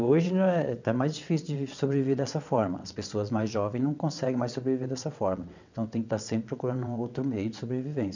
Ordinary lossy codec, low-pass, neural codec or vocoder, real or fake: none; 7.2 kHz; vocoder, 22.05 kHz, 80 mel bands, WaveNeXt; fake